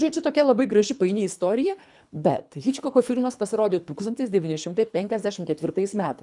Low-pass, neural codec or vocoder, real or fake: 10.8 kHz; codec, 24 kHz, 3 kbps, HILCodec; fake